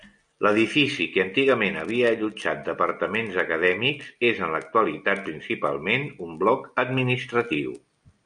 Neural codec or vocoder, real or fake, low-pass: none; real; 9.9 kHz